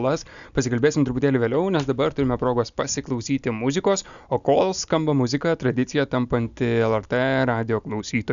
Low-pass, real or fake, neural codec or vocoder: 7.2 kHz; real; none